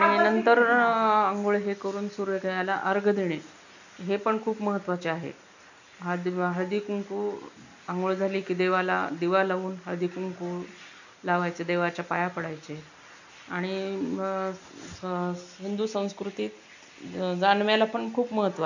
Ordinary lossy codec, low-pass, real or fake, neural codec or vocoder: none; 7.2 kHz; real; none